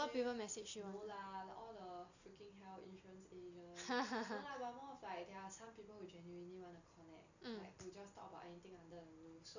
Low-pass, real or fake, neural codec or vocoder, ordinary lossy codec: 7.2 kHz; real; none; none